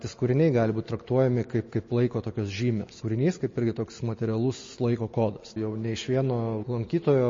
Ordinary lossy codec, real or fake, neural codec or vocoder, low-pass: MP3, 32 kbps; real; none; 7.2 kHz